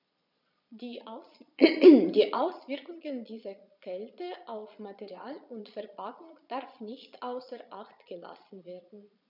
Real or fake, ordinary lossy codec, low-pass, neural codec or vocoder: fake; none; 5.4 kHz; vocoder, 22.05 kHz, 80 mel bands, Vocos